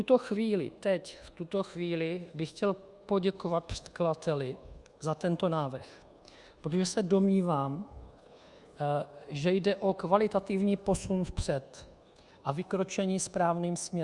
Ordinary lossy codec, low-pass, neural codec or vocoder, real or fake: Opus, 64 kbps; 10.8 kHz; codec, 24 kHz, 1.2 kbps, DualCodec; fake